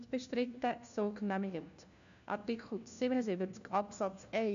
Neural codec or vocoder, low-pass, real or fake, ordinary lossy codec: codec, 16 kHz, 1 kbps, FunCodec, trained on LibriTTS, 50 frames a second; 7.2 kHz; fake; none